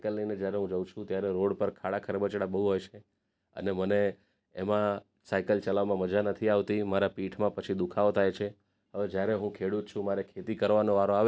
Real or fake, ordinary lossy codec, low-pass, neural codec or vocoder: real; none; none; none